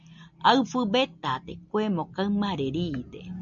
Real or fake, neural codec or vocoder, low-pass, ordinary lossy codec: real; none; 7.2 kHz; MP3, 96 kbps